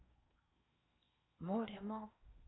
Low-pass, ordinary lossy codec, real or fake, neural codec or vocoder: 7.2 kHz; AAC, 16 kbps; fake; codec, 16 kHz in and 24 kHz out, 0.6 kbps, FocalCodec, streaming, 4096 codes